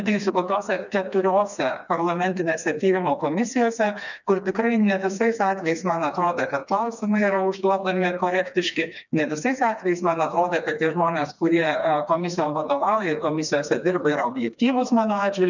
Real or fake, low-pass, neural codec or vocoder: fake; 7.2 kHz; codec, 16 kHz, 2 kbps, FreqCodec, smaller model